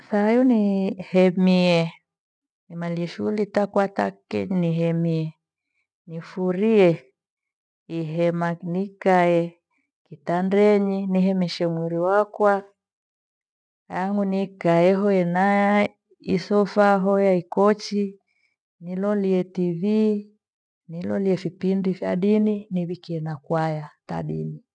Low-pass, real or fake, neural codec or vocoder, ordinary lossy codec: none; real; none; none